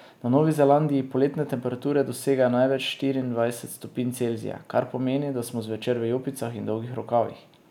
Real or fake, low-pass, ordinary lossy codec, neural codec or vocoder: real; 19.8 kHz; none; none